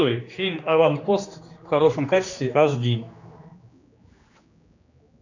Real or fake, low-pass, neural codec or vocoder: fake; 7.2 kHz; codec, 16 kHz, 2 kbps, X-Codec, HuBERT features, trained on general audio